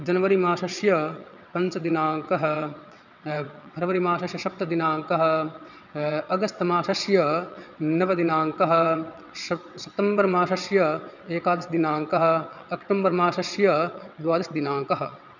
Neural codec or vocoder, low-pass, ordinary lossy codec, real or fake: vocoder, 22.05 kHz, 80 mel bands, WaveNeXt; 7.2 kHz; none; fake